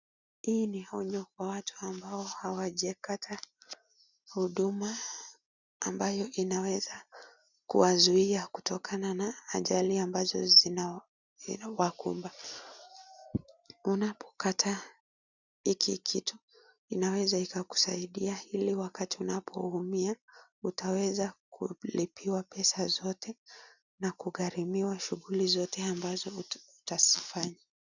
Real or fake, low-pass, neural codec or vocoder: real; 7.2 kHz; none